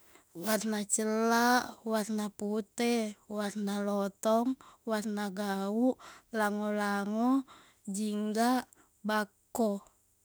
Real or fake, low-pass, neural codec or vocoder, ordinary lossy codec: fake; none; autoencoder, 48 kHz, 32 numbers a frame, DAC-VAE, trained on Japanese speech; none